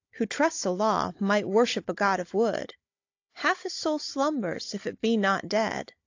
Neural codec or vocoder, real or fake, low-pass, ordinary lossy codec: codec, 16 kHz, 16 kbps, FunCodec, trained on Chinese and English, 50 frames a second; fake; 7.2 kHz; AAC, 48 kbps